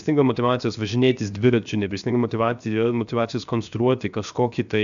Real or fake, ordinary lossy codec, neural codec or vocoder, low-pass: fake; MP3, 96 kbps; codec, 16 kHz, 0.7 kbps, FocalCodec; 7.2 kHz